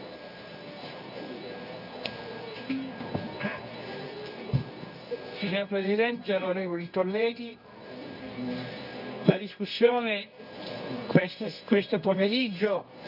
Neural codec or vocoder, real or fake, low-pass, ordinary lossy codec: codec, 24 kHz, 0.9 kbps, WavTokenizer, medium music audio release; fake; 5.4 kHz; none